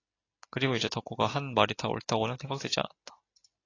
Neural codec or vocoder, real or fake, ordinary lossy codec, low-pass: none; real; AAC, 32 kbps; 7.2 kHz